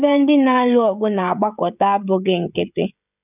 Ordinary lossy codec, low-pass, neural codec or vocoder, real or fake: none; 3.6 kHz; codec, 16 kHz, 16 kbps, FreqCodec, smaller model; fake